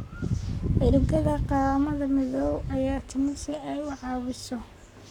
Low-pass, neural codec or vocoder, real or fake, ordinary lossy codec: 19.8 kHz; codec, 44.1 kHz, 7.8 kbps, Pupu-Codec; fake; none